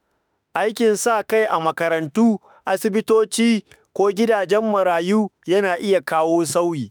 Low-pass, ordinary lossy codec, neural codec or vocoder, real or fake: none; none; autoencoder, 48 kHz, 32 numbers a frame, DAC-VAE, trained on Japanese speech; fake